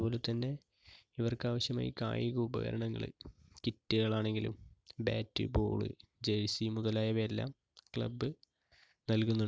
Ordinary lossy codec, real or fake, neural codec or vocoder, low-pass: none; real; none; none